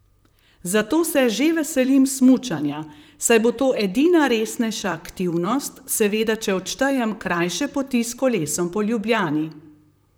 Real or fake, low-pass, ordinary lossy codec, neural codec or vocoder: fake; none; none; vocoder, 44.1 kHz, 128 mel bands, Pupu-Vocoder